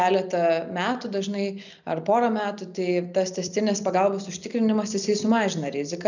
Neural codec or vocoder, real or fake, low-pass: none; real; 7.2 kHz